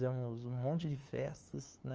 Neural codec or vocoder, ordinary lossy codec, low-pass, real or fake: codec, 16 kHz, 2 kbps, FunCodec, trained on LibriTTS, 25 frames a second; Opus, 24 kbps; 7.2 kHz; fake